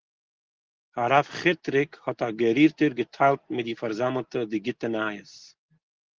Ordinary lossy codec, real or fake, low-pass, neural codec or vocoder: Opus, 16 kbps; real; 7.2 kHz; none